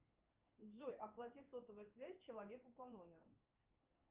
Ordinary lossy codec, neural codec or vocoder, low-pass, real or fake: Opus, 24 kbps; codec, 16 kHz in and 24 kHz out, 1 kbps, XY-Tokenizer; 3.6 kHz; fake